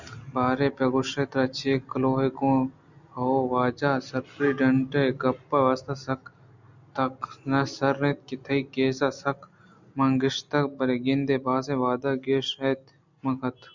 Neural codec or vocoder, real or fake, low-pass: none; real; 7.2 kHz